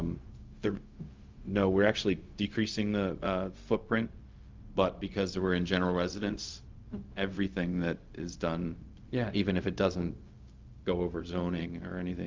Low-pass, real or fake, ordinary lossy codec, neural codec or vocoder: 7.2 kHz; fake; Opus, 24 kbps; codec, 16 kHz, 0.4 kbps, LongCat-Audio-Codec